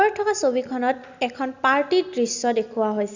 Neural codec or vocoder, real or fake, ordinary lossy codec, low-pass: none; real; Opus, 64 kbps; 7.2 kHz